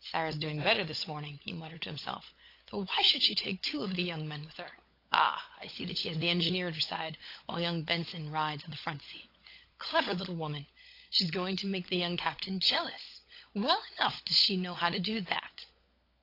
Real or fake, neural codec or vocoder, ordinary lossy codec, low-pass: fake; codec, 16 kHz, 16 kbps, FunCodec, trained on LibriTTS, 50 frames a second; AAC, 32 kbps; 5.4 kHz